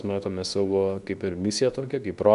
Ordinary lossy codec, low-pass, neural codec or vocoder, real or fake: AAC, 96 kbps; 10.8 kHz; codec, 24 kHz, 0.9 kbps, WavTokenizer, medium speech release version 2; fake